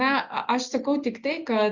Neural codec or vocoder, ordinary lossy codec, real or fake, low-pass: none; Opus, 64 kbps; real; 7.2 kHz